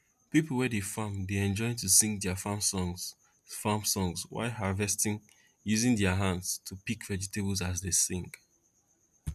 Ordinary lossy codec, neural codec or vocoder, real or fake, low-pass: MP3, 96 kbps; none; real; 14.4 kHz